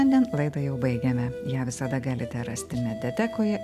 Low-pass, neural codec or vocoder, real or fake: 14.4 kHz; none; real